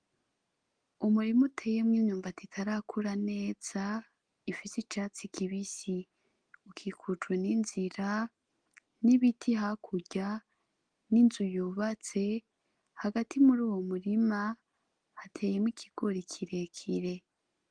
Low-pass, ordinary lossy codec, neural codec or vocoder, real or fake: 9.9 kHz; Opus, 24 kbps; none; real